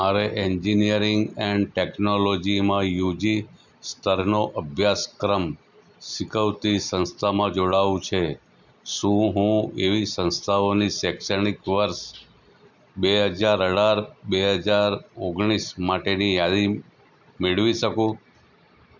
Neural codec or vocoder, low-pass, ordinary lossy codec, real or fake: none; 7.2 kHz; none; real